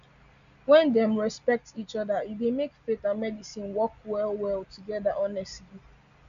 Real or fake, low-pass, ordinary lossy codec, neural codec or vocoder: real; 7.2 kHz; none; none